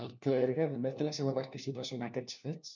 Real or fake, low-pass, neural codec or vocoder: fake; 7.2 kHz; codec, 16 kHz, 1 kbps, FunCodec, trained on LibriTTS, 50 frames a second